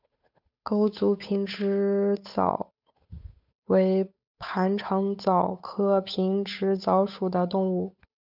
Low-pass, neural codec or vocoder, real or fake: 5.4 kHz; codec, 16 kHz, 8 kbps, FunCodec, trained on Chinese and English, 25 frames a second; fake